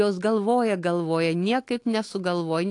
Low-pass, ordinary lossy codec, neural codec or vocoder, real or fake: 10.8 kHz; AAC, 48 kbps; autoencoder, 48 kHz, 128 numbers a frame, DAC-VAE, trained on Japanese speech; fake